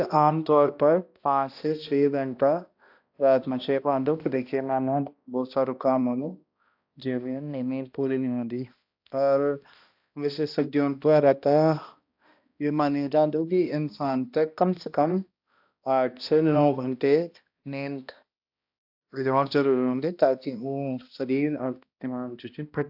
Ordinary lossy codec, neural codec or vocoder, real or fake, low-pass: AAC, 48 kbps; codec, 16 kHz, 1 kbps, X-Codec, HuBERT features, trained on balanced general audio; fake; 5.4 kHz